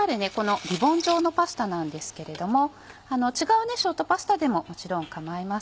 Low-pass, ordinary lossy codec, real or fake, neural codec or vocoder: none; none; real; none